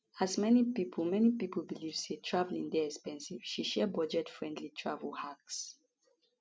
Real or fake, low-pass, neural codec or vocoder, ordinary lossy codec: real; none; none; none